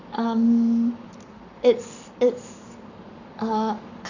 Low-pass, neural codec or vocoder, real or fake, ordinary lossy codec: 7.2 kHz; codec, 44.1 kHz, 7.8 kbps, Pupu-Codec; fake; none